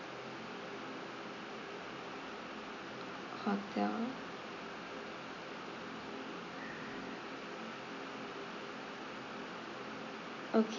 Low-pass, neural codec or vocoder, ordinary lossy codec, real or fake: 7.2 kHz; none; none; real